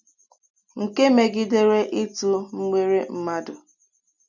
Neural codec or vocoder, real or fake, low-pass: none; real; 7.2 kHz